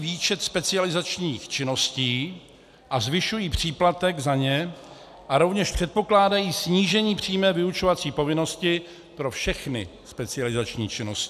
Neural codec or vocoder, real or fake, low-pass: none; real; 14.4 kHz